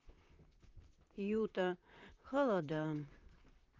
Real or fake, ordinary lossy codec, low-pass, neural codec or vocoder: fake; Opus, 24 kbps; 7.2 kHz; vocoder, 44.1 kHz, 128 mel bands, Pupu-Vocoder